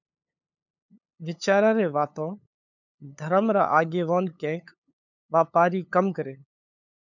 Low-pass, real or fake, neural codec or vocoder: 7.2 kHz; fake; codec, 16 kHz, 8 kbps, FunCodec, trained on LibriTTS, 25 frames a second